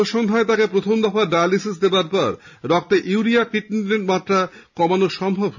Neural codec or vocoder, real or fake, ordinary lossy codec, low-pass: none; real; none; 7.2 kHz